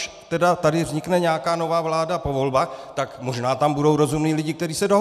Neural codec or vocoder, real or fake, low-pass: none; real; 14.4 kHz